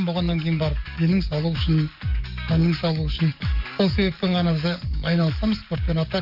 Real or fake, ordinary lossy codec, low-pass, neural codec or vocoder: fake; none; 5.4 kHz; codec, 44.1 kHz, 7.8 kbps, DAC